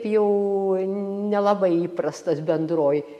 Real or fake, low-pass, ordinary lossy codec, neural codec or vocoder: real; 14.4 kHz; MP3, 64 kbps; none